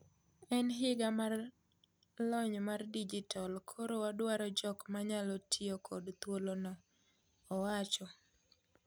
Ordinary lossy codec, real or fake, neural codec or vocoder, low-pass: none; real; none; none